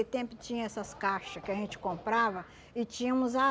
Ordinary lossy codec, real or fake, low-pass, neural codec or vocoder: none; real; none; none